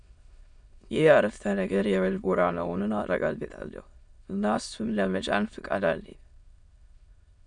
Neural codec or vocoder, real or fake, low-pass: autoencoder, 22.05 kHz, a latent of 192 numbers a frame, VITS, trained on many speakers; fake; 9.9 kHz